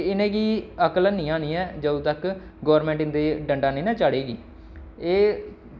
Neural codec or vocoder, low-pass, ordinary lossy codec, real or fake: none; none; none; real